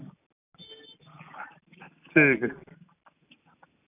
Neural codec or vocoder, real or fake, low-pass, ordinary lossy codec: none; real; 3.6 kHz; none